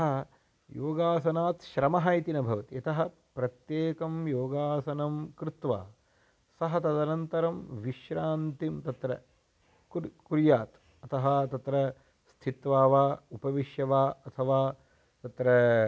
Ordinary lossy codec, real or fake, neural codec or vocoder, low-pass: none; real; none; none